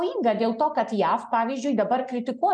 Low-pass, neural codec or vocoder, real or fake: 9.9 kHz; none; real